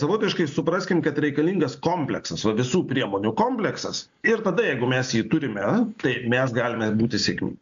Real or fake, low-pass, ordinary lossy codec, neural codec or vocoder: real; 7.2 kHz; AAC, 64 kbps; none